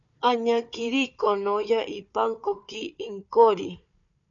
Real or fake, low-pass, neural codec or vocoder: fake; 7.2 kHz; codec, 16 kHz, 4 kbps, FunCodec, trained on Chinese and English, 50 frames a second